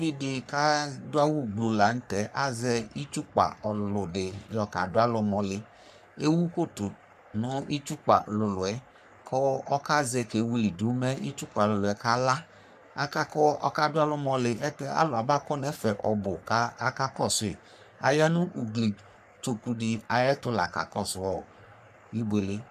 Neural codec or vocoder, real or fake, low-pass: codec, 44.1 kHz, 3.4 kbps, Pupu-Codec; fake; 14.4 kHz